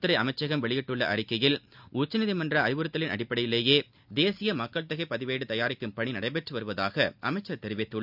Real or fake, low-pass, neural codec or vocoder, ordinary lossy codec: real; 5.4 kHz; none; none